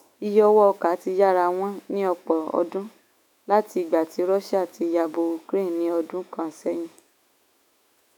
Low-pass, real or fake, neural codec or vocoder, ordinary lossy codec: 19.8 kHz; fake; autoencoder, 48 kHz, 128 numbers a frame, DAC-VAE, trained on Japanese speech; none